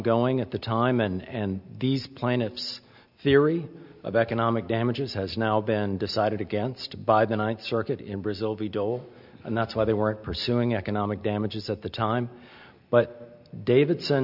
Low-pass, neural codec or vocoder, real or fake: 5.4 kHz; none; real